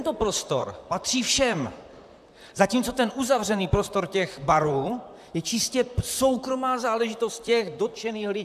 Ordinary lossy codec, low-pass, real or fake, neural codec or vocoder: AAC, 96 kbps; 14.4 kHz; fake; vocoder, 44.1 kHz, 128 mel bands, Pupu-Vocoder